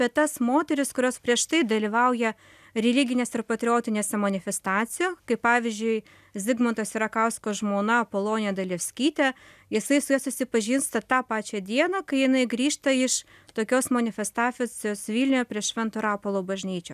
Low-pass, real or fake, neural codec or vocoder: 14.4 kHz; real; none